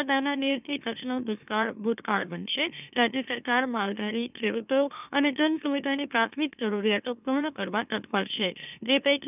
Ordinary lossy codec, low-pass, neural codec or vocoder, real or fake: none; 3.6 kHz; autoencoder, 44.1 kHz, a latent of 192 numbers a frame, MeloTTS; fake